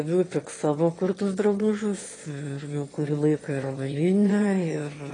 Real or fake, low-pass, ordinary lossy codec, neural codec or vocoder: fake; 9.9 kHz; AAC, 32 kbps; autoencoder, 22.05 kHz, a latent of 192 numbers a frame, VITS, trained on one speaker